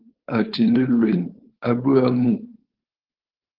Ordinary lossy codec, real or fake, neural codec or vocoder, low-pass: Opus, 16 kbps; fake; codec, 16 kHz, 4.8 kbps, FACodec; 5.4 kHz